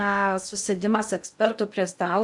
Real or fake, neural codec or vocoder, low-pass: fake; codec, 16 kHz in and 24 kHz out, 0.6 kbps, FocalCodec, streaming, 2048 codes; 10.8 kHz